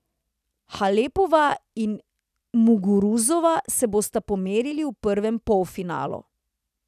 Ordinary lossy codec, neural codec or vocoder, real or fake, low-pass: none; none; real; 14.4 kHz